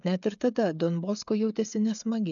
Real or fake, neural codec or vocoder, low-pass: fake; codec, 16 kHz, 16 kbps, FreqCodec, smaller model; 7.2 kHz